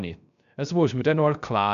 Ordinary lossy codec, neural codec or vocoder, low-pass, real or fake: none; codec, 16 kHz, 0.3 kbps, FocalCodec; 7.2 kHz; fake